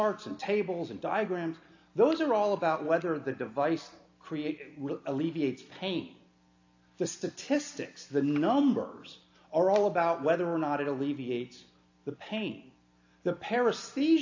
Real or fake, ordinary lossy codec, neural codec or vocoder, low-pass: real; AAC, 48 kbps; none; 7.2 kHz